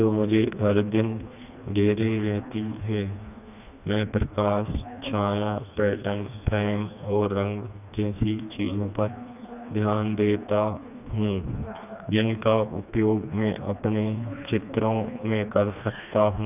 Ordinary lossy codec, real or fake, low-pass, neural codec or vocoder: none; fake; 3.6 kHz; codec, 16 kHz, 2 kbps, FreqCodec, smaller model